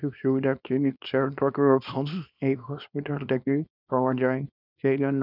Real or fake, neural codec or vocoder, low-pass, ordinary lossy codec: fake; codec, 24 kHz, 0.9 kbps, WavTokenizer, small release; 5.4 kHz; none